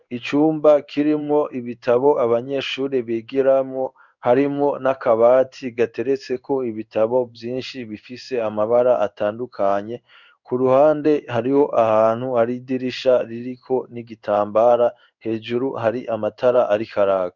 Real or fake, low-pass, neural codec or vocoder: fake; 7.2 kHz; codec, 16 kHz in and 24 kHz out, 1 kbps, XY-Tokenizer